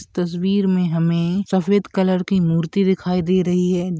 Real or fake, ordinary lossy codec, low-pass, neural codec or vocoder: real; none; none; none